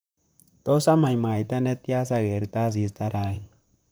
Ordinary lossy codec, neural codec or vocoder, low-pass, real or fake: none; vocoder, 44.1 kHz, 128 mel bands, Pupu-Vocoder; none; fake